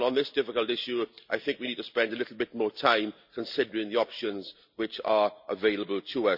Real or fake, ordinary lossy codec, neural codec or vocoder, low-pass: real; none; none; 5.4 kHz